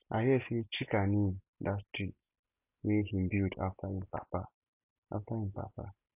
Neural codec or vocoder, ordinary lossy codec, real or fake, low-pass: none; none; real; 3.6 kHz